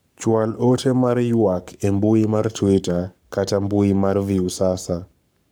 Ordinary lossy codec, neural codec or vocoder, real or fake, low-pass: none; codec, 44.1 kHz, 7.8 kbps, Pupu-Codec; fake; none